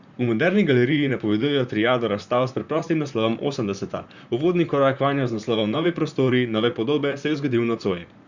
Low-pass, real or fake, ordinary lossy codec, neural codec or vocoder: 7.2 kHz; fake; Opus, 64 kbps; vocoder, 44.1 kHz, 128 mel bands, Pupu-Vocoder